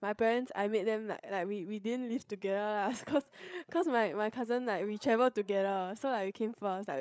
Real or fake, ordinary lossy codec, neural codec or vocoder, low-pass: fake; none; codec, 16 kHz, 8 kbps, FreqCodec, larger model; none